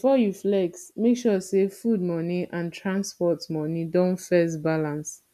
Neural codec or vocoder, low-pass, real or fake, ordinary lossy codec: none; 14.4 kHz; real; none